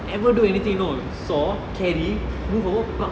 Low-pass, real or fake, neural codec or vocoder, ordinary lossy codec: none; real; none; none